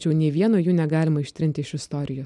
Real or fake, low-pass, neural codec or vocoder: real; 10.8 kHz; none